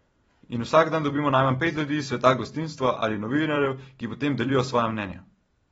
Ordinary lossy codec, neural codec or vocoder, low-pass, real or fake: AAC, 24 kbps; vocoder, 44.1 kHz, 128 mel bands every 256 samples, BigVGAN v2; 19.8 kHz; fake